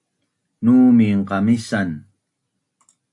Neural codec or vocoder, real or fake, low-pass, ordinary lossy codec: none; real; 10.8 kHz; AAC, 64 kbps